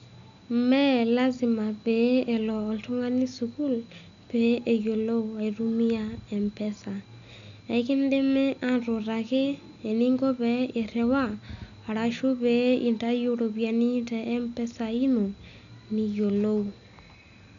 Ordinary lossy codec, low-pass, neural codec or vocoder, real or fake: none; 7.2 kHz; none; real